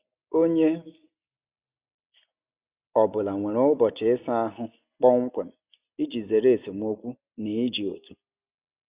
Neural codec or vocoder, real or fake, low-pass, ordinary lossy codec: none; real; 3.6 kHz; Opus, 64 kbps